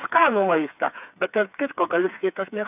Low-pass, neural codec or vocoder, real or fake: 3.6 kHz; codec, 16 kHz, 4 kbps, FreqCodec, smaller model; fake